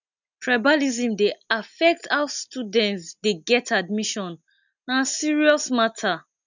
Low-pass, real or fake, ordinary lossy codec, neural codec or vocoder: 7.2 kHz; real; none; none